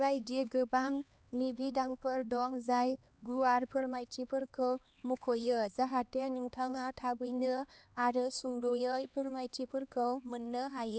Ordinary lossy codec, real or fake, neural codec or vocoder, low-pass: none; fake; codec, 16 kHz, 2 kbps, X-Codec, HuBERT features, trained on LibriSpeech; none